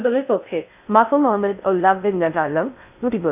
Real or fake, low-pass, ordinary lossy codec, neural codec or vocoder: fake; 3.6 kHz; none; codec, 16 kHz in and 24 kHz out, 0.6 kbps, FocalCodec, streaming, 2048 codes